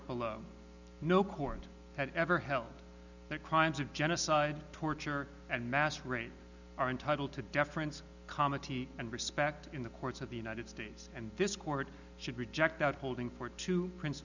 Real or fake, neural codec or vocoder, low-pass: real; none; 7.2 kHz